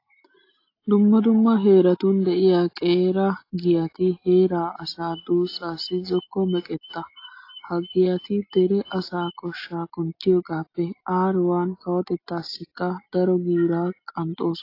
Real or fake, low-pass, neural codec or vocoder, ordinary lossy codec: real; 5.4 kHz; none; AAC, 32 kbps